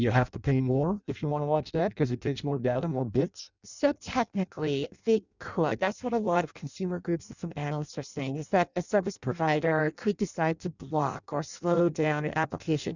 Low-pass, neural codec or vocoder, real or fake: 7.2 kHz; codec, 16 kHz in and 24 kHz out, 0.6 kbps, FireRedTTS-2 codec; fake